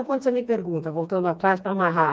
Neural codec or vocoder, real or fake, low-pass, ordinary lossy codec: codec, 16 kHz, 2 kbps, FreqCodec, smaller model; fake; none; none